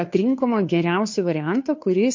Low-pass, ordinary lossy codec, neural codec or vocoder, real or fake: 7.2 kHz; MP3, 48 kbps; codec, 16 kHz, 2 kbps, FunCodec, trained on Chinese and English, 25 frames a second; fake